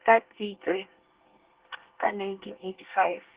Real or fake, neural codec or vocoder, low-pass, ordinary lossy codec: fake; codec, 24 kHz, 1 kbps, SNAC; 3.6 kHz; Opus, 16 kbps